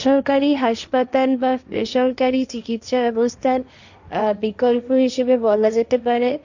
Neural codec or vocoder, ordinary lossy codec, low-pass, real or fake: codec, 16 kHz, 1.1 kbps, Voila-Tokenizer; none; 7.2 kHz; fake